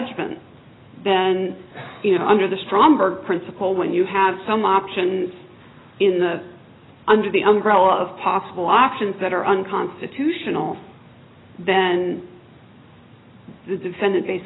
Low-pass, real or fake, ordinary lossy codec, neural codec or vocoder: 7.2 kHz; real; AAC, 16 kbps; none